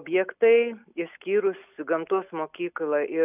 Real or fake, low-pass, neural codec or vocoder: real; 3.6 kHz; none